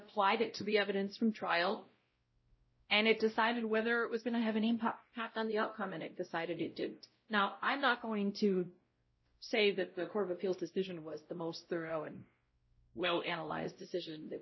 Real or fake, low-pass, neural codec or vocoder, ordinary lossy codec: fake; 7.2 kHz; codec, 16 kHz, 0.5 kbps, X-Codec, WavLM features, trained on Multilingual LibriSpeech; MP3, 24 kbps